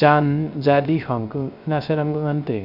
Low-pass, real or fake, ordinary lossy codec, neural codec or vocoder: 5.4 kHz; fake; none; codec, 16 kHz, 0.3 kbps, FocalCodec